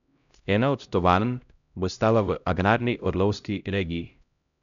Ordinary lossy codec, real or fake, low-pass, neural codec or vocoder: none; fake; 7.2 kHz; codec, 16 kHz, 0.5 kbps, X-Codec, HuBERT features, trained on LibriSpeech